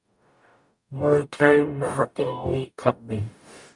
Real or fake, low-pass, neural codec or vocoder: fake; 10.8 kHz; codec, 44.1 kHz, 0.9 kbps, DAC